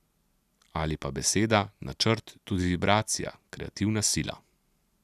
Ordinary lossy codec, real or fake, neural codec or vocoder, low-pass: none; real; none; 14.4 kHz